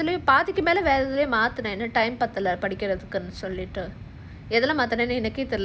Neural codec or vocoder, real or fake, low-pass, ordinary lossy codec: none; real; none; none